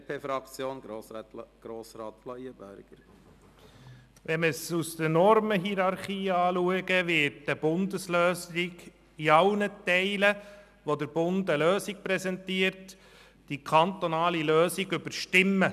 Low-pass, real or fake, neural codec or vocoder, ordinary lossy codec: 14.4 kHz; real; none; none